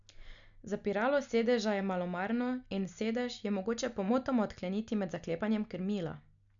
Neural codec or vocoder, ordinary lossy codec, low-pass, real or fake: none; none; 7.2 kHz; real